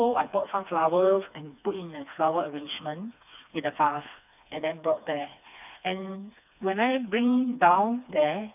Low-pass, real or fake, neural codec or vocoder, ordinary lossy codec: 3.6 kHz; fake; codec, 16 kHz, 2 kbps, FreqCodec, smaller model; none